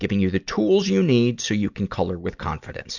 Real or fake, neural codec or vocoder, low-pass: real; none; 7.2 kHz